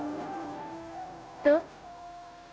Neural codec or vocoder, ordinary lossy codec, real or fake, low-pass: codec, 16 kHz, 0.5 kbps, FunCodec, trained on Chinese and English, 25 frames a second; none; fake; none